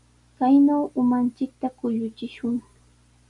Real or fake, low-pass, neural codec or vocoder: real; 10.8 kHz; none